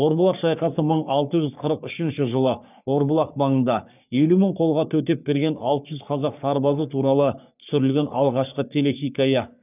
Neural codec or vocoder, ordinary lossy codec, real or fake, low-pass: codec, 44.1 kHz, 3.4 kbps, Pupu-Codec; none; fake; 3.6 kHz